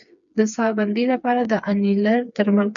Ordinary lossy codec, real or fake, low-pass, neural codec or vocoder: MP3, 96 kbps; fake; 7.2 kHz; codec, 16 kHz, 4 kbps, FreqCodec, smaller model